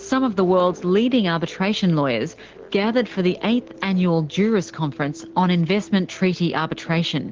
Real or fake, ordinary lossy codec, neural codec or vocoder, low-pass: real; Opus, 16 kbps; none; 7.2 kHz